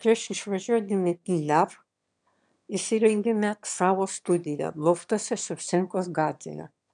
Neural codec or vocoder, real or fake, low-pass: autoencoder, 22.05 kHz, a latent of 192 numbers a frame, VITS, trained on one speaker; fake; 9.9 kHz